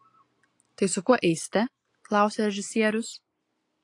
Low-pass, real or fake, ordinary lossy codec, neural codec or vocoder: 10.8 kHz; real; AAC, 48 kbps; none